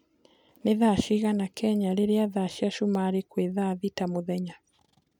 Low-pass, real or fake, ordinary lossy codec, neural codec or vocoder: 19.8 kHz; real; none; none